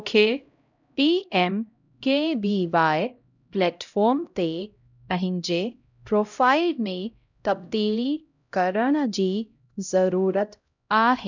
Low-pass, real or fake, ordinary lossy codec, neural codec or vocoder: 7.2 kHz; fake; none; codec, 16 kHz, 0.5 kbps, X-Codec, HuBERT features, trained on LibriSpeech